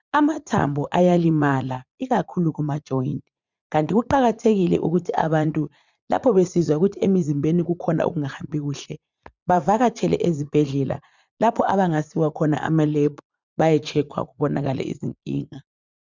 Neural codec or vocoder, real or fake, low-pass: vocoder, 44.1 kHz, 80 mel bands, Vocos; fake; 7.2 kHz